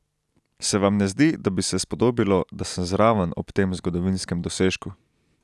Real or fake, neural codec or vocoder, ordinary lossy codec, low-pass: real; none; none; none